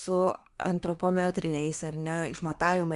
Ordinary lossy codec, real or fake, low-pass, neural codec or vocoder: Opus, 64 kbps; fake; 10.8 kHz; codec, 24 kHz, 1 kbps, SNAC